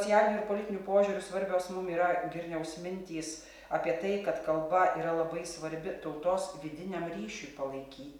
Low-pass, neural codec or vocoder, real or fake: 19.8 kHz; none; real